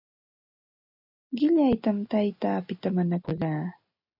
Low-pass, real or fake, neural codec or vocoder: 5.4 kHz; real; none